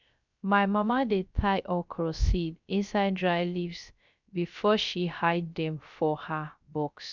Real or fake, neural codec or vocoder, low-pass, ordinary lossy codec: fake; codec, 16 kHz, 0.3 kbps, FocalCodec; 7.2 kHz; none